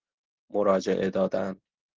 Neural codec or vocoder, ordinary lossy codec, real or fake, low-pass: none; Opus, 16 kbps; real; 7.2 kHz